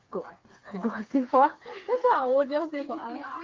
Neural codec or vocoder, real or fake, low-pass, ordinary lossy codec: codec, 16 kHz, 4 kbps, FreqCodec, smaller model; fake; 7.2 kHz; Opus, 32 kbps